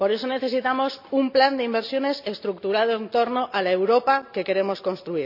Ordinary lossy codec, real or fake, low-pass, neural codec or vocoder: none; real; 5.4 kHz; none